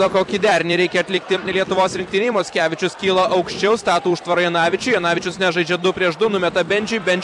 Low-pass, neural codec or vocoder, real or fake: 10.8 kHz; vocoder, 44.1 kHz, 128 mel bands every 256 samples, BigVGAN v2; fake